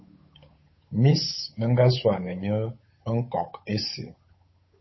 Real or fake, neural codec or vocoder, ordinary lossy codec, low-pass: fake; codec, 16 kHz, 8 kbps, FunCodec, trained on Chinese and English, 25 frames a second; MP3, 24 kbps; 7.2 kHz